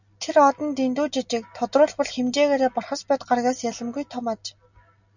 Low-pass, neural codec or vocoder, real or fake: 7.2 kHz; none; real